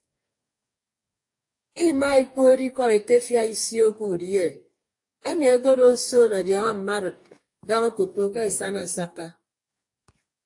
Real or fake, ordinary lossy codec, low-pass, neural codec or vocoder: fake; AAC, 64 kbps; 10.8 kHz; codec, 44.1 kHz, 2.6 kbps, DAC